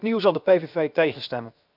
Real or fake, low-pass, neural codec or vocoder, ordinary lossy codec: fake; 5.4 kHz; codec, 16 kHz, 0.7 kbps, FocalCodec; none